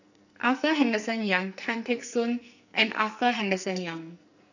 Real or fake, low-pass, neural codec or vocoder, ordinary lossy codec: fake; 7.2 kHz; codec, 44.1 kHz, 2.6 kbps, SNAC; none